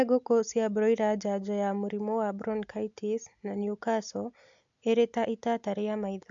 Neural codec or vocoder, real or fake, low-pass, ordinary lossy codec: none; real; 7.2 kHz; AAC, 64 kbps